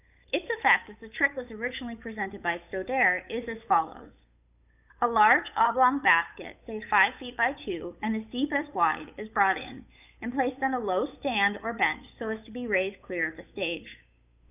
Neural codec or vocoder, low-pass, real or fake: codec, 16 kHz, 8 kbps, FunCodec, trained on Chinese and English, 25 frames a second; 3.6 kHz; fake